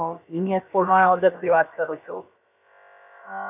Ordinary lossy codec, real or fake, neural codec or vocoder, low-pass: none; fake; codec, 16 kHz, about 1 kbps, DyCAST, with the encoder's durations; 3.6 kHz